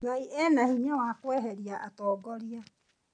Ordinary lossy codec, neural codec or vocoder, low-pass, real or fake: none; none; 9.9 kHz; real